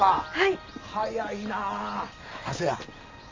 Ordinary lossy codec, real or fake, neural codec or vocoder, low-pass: MP3, 48 kbps; real; none; 7.2 kHz